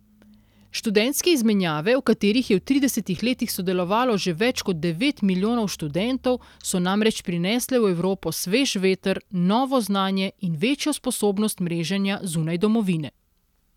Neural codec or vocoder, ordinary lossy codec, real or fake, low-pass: none; none; real; 19.8 kHz